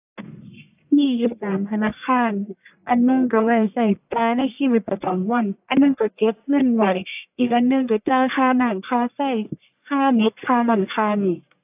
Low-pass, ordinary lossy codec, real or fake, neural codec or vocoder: 3.6 kHz; AAC, 32 kbps; fake; codec, 44.1 kHz, 1.7 kbps, Pupu-Codec